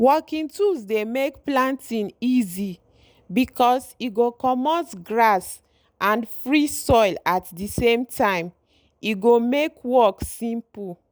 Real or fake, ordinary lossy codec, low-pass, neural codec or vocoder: real; none; none; none